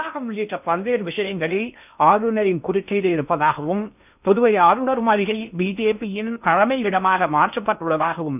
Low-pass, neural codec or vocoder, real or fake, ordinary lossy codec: 3.6 kHz; codec, 16 kHz in and 24 kHz out, 0.6 kbps, FocalCodec, streaming, 2048 codes; fake; none